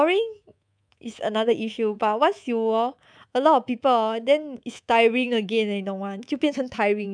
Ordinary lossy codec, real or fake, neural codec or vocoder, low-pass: none; fake; codec, 24 kHz, 3.1 kbps, DualCodec; 9.9 kHz